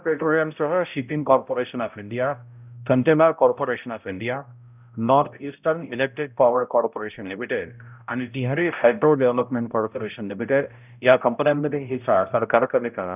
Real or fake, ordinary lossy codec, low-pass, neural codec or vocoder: fake; none; 3.6 kHz; codec, 16 kHz, 0.5 kbps, X-Codec, HuBERT features, trained on balanced general audio